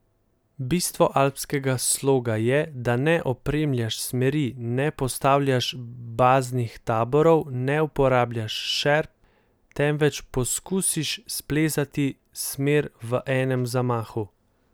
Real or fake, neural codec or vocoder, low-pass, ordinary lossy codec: real; none; none; none